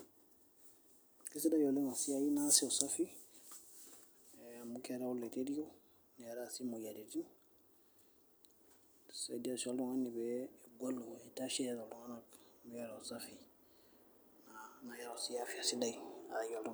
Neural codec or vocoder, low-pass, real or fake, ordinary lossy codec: none; none; real; none